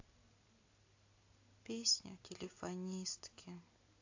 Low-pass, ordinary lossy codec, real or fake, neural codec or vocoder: 7.2 kHz; AAC, 48 kbps; real; none